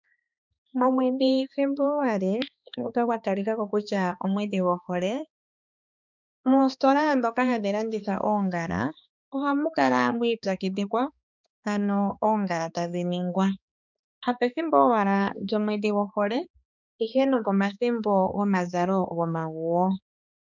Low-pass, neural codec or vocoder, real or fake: 7.2 kHz; codec, 16 kHz, 2 kbps, X-Codec, HuBERT features, trained on balanced general audio; fake